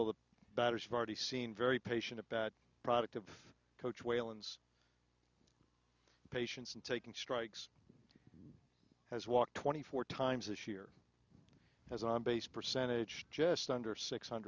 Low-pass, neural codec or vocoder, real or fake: 7.2 kHz; none; real